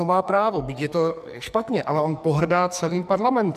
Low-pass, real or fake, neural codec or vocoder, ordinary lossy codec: 14.4 kHz; fake; codec, 44.1 kHz, 2.6 kbps, SNAC; MP3, 96 kbps